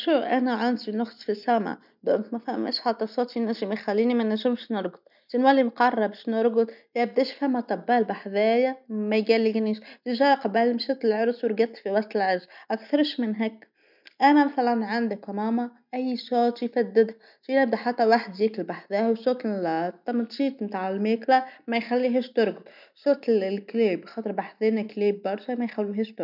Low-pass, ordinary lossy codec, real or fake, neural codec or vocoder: 5.4 kHz; none; real; none